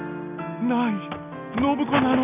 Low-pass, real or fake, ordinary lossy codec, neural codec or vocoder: 3.6 kHz; real; none; none